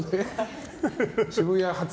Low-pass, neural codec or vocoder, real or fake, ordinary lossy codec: none; none; real; none